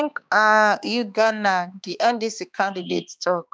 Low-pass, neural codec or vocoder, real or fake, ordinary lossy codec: none; codec, 16 kHz, 2 kbps, X-Codec, HuBERT features, trained on balanced general audio; fake; none